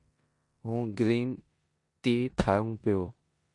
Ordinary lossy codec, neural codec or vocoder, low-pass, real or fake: MP3, 48 kbps; codec, 16 kHz in and 24 kHz out, 0.9 kbps, LongCat-Audio-Codec, four codebook decoder; 10.8 kHz; fake